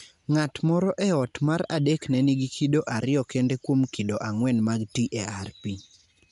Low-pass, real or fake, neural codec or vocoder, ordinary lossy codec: 10.8 kHz; real; none; none